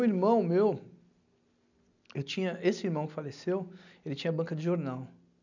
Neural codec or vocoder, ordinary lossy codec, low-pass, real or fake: none; none; 7.2 kHz; real